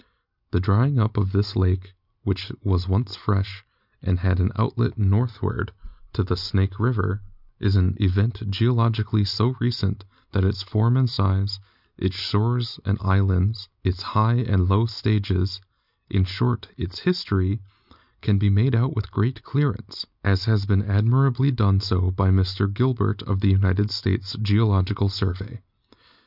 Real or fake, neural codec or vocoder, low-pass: real; none; 5.4 kHz